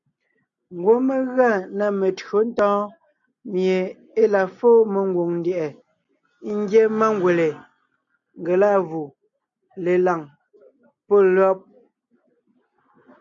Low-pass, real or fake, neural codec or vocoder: 7.2 kHz; real; none